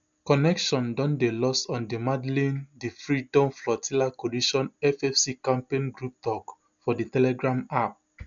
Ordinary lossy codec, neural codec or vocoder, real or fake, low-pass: none; none; real; 7.2 kHz